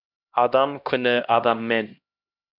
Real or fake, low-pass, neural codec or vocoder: fake; 5.4 kHz; codec, 16 kHz, 1 kbps, X-Codec, HuBERT features, trained on LibriSpeech